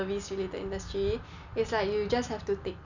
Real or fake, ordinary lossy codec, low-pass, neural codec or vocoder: real; none; 7.2 kHz; none